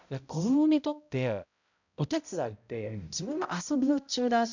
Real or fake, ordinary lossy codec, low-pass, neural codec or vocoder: fake; none; 7.2 kHz; codec, 16 kHz, 0.5 kbps, X-Codec, HuBERT features, trained on balanced general audio